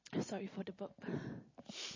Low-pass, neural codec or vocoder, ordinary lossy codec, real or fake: 7.2 kHz; none; MP3, 32 kbps; real